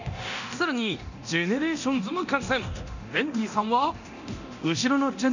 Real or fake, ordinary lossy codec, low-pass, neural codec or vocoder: fake; none; 7.2 kHz; codec, 24 kHz, 0.9 kbps, DualCodec